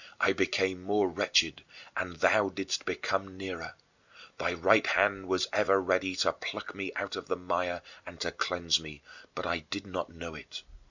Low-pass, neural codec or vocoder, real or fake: 7.2 kHz; none; real